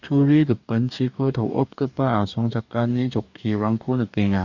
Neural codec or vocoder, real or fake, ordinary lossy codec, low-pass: codec, 44.1 kHz, 2.6 kbps, DAC; fake; none; 7.2 kHz